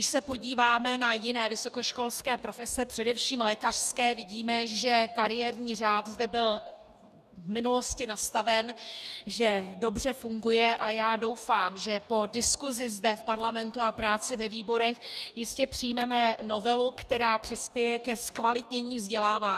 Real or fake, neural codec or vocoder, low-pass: fake; codec, 44.1 kHz, 2.6 kbps, DAC; 14.4 kHz